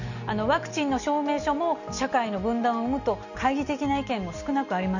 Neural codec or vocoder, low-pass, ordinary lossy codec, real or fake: none; 7.2 kHz; none; real